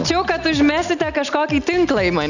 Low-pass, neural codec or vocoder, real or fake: 7.2 kHz; none; real